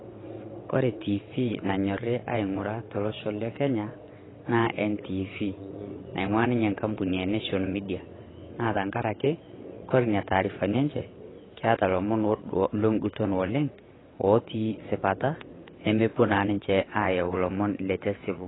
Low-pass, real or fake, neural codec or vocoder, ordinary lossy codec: 7.2 kHz; fake; vocoder, 22.05 kHz, 80 mel bands, WaveNeXt; AAC, 16 kbps